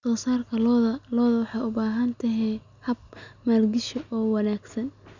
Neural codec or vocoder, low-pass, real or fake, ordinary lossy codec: none; 7.2 kHz; real; none